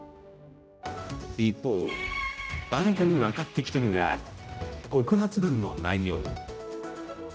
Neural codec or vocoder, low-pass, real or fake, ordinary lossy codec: codec, 16 kHz, 0.5 kbps, X-Codec, HuBERT features, trained on general audio; none; fake; none